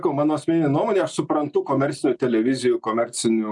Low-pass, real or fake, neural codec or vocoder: 10.8 kHz; real; none